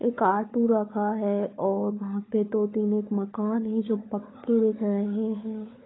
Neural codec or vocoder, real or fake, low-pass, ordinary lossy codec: codec, 16 kHz, 16 kbps, FunCodec, trained on LibriTTS, 50 frames a second; fake; 7.2 kHz; AAC, 16 kbps